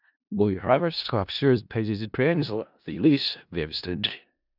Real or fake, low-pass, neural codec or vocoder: fake; 5.4 kHz; codec, 16 kHz in and 24 kHz out, 0.4 kbps, LongCat-Audio-Codec, four codebook decoder